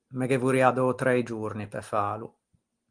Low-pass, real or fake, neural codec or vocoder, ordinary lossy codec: 9.9 kHz; real; none; Opus, 24 kbps